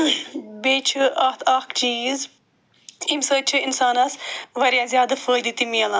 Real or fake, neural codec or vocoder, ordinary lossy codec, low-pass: real; none; none; none